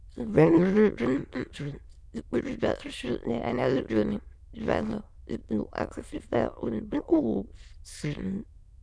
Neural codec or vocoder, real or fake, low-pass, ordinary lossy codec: autoencoder, 22.05 kHz, a latent of 192 numbers a frame, VITS, trained on many speakers; fake; none; none